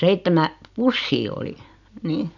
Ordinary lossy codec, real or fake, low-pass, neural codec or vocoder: none; real; 7.2 kHz; none